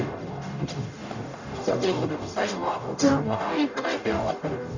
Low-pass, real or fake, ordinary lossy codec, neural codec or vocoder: 7.2 kHz; fake; none; codec, 44.1 kHz, 0.9 kbps, DAC